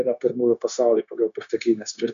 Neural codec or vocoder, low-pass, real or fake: codec, 16 kHz, 0.9 kbps, LongCat-Audio-Codec; 7.2 kHz; fake